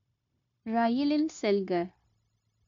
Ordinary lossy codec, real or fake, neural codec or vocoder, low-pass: none; fake; codec, 16 kHz, 0.9 kbps, LongCat-Audio-Codec; 7.2 kHz